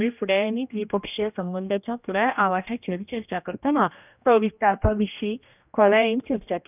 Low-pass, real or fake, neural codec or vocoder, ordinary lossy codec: 3.6 kHz; fake; codec, 16 kHz, 1 kbps, X-Codec, HuBERT features, trained on general audio; none